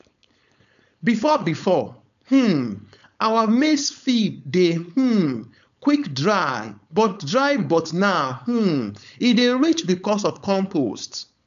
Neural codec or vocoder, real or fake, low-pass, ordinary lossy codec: codec, 16 kHz, 4.8 kbps, FACodec; fake; 7.2 kHz; none